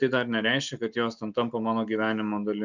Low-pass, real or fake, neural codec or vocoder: 7.2 kHz; real; none